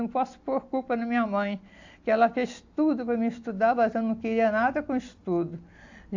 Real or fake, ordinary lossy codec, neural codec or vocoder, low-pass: real; AAC, 48 kbps; none; 7.2 kHz